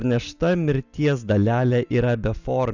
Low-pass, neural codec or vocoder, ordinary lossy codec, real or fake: 7.2 kHz; none; Opus, 64 kbps; real